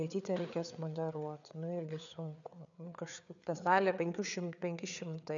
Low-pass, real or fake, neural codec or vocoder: 7.2 kHz; fake; codec, 16 kHz, 4 kbps, FreqCodec, larger model